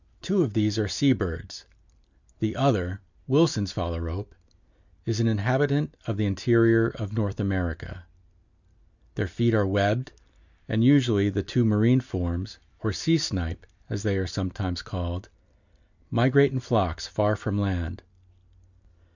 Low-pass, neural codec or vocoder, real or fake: 7.2 kHz; none; real